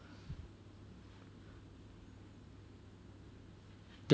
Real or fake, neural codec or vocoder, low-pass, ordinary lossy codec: real; none; none; none